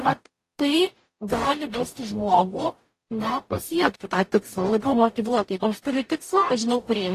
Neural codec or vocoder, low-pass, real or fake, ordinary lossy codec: codec, 44.1 kHz, 0.9 kbps, DAC; 14.4 kHz; fake; AAC, 48 kbps